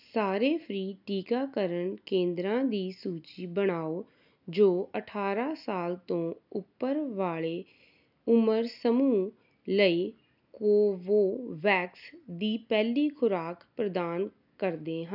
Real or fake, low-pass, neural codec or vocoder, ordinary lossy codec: real; 5.4 kHz; none; none